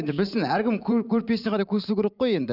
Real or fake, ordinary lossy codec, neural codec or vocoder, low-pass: fake; none; vocoder, 44.1 kHz, 128 mel bands every 512 samples, BigVGAN v2; 5.4 kHz